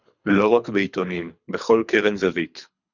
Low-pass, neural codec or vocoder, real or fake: 7.2 kHz; codec, 24 kHz, 3 kbps, HILCodec; fake